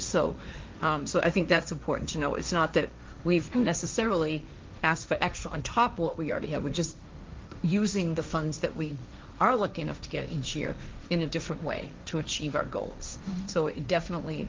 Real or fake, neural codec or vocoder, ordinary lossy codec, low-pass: fake; codec, 16 kHz, 1.1 kbps, Voila-Tokenizer; Opus, 24 kbps; 7.2 kHz